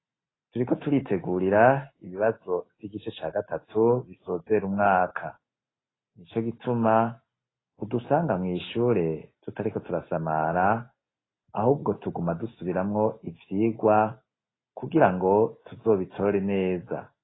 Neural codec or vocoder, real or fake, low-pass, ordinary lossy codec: none; real; 7.2 kHz; AAC, 16 kbps